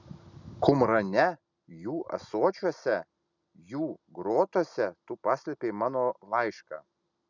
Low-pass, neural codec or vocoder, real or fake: 7.2 kHz; none; real